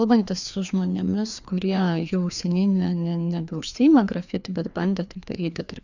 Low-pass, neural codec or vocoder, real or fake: 7.2 kHz; codec, 16 kHz, 2 kbps, FreqCodec, larger model; fake